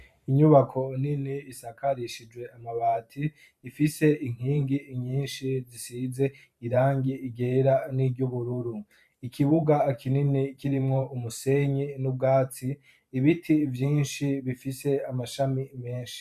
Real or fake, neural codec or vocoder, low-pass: fake; vocoder, 44.1 kHz, 128 mel bands every 512 samples, BigVGAN v2; 14.4 kHz